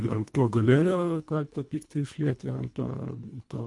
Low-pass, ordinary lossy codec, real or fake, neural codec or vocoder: 10.8 kHz; MP3, 64 kbps; fake; codec, 24 kHz, 1.5 kbps, HILCodec